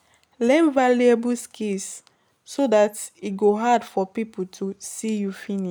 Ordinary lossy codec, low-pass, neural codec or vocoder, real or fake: none; none; none; real